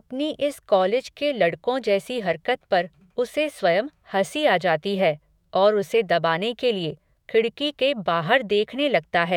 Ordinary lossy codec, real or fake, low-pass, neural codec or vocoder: none; fake; 19.8 kHz; autoencoder, 48 kHz, 128 numbers a frame, DAC-VAE, trained on Japanese speech